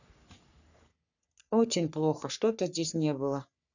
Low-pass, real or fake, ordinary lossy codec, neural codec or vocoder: 7.2 kHz; fake; none; codec, 44.1 kHz, 3.4 kbps, Pupu-Codec